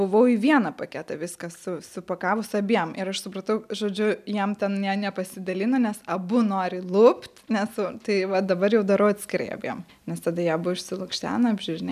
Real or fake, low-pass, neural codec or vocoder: real; 14.4 kHz; none